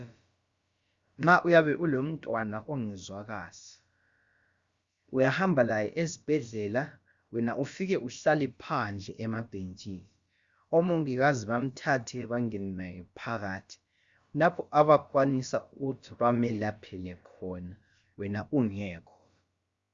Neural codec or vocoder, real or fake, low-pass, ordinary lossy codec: codec, 16 kHz, about 1 kbps, DyCAST, with the encoder's durations; fake; 7.2 kHz; Opus, 64 kbps